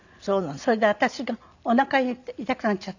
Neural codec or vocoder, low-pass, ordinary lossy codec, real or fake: none; 7.2 kHz; none; real